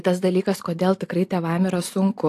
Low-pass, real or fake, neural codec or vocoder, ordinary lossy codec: 14.4 kHz; real; none; AAC, 64 kbps